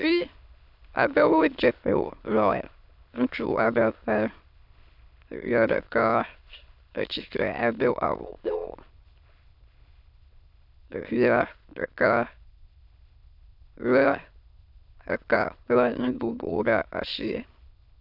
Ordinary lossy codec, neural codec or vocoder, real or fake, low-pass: AAC, 48 kbps; autoencoder, 22.05 kHz, a latent of 192 numbers a frame, VITS, trained on many speakers; fake; 5.4 kHz